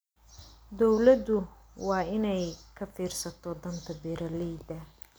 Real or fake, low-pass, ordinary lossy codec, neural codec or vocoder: real; none; none; none